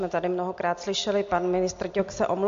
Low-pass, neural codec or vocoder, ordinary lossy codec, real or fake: 7.2 kHz; none; MP3, 48 kbps; real